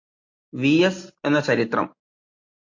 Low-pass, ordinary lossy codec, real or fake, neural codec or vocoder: 7.2 kHz; MP3, 48 kbps; real; none